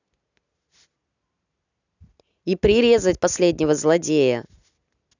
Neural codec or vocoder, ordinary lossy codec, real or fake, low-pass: none; none; real; 7.2 kHz